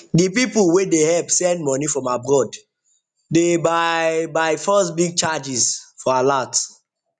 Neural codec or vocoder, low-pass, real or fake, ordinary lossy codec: none; 9.9 kHz; real; none